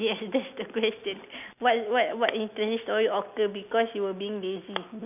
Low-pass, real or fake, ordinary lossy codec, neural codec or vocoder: 3.6 kHz; real; none; none